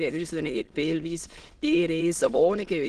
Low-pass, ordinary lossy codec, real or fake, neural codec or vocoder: 9.9 kHz; Opus, 16 kbps; fake; autoencoder, 22.05 kHz, a latent of 192 numbers a frame, VITS, trained on many speakers